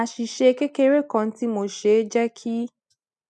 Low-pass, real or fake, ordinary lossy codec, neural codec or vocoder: none; real; none; none